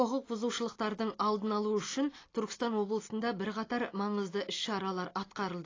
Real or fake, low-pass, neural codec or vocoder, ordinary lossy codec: real; 7.2 kHz; none; AAC, 32 kbps